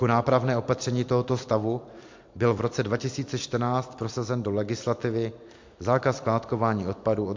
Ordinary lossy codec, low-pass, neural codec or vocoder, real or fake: MP3, 48 kbps; 7.2 kHz; vocoder, 44.1 kHz, 128 mel bands every 256 samples, BigVGAN v2; fake